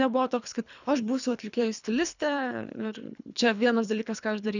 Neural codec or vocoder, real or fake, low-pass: codec, 24 kHz, 3 kbps, HILCodec; fake; 7.2 kHz